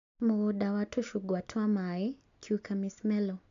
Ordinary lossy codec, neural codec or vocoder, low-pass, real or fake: MP3, 64 kbps; none; 7.2 kHz; real